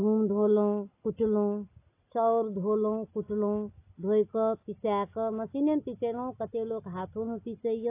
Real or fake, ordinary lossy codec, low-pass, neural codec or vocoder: real; AAC, 24 kbps; 3.6 kHz; none